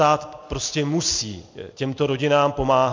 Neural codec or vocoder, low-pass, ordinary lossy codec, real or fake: none; 7.2 kHz; MP3, 64 kbps; real